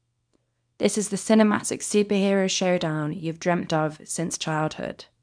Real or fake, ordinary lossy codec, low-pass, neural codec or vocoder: fake; none; 9.9 kHz; codec, 24 kHz, 0.9 kbps, WavTokenizer, small release